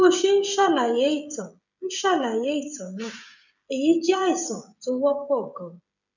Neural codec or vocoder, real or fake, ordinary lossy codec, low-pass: codec, 16 kHz, 16 kbps, FreqCodec, smaller model; fake; none; 7.2 kHz